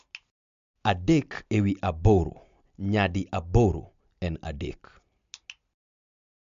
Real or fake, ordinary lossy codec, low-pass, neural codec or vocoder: real; MP3, 64 kbps; 7.2 kHz; none